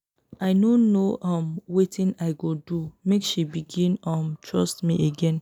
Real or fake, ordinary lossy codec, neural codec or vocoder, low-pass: real; none; none; 19.8 kHz